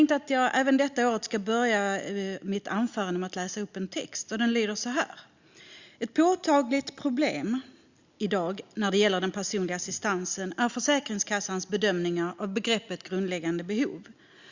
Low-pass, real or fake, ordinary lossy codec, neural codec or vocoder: 7.2 kHz; real; Opus, 64 kbps; none